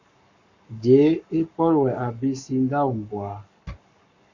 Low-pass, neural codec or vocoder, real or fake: 7.2 kHz; codec, 44.1 kHz, 7.8 kbps, Pupu-Codec; fake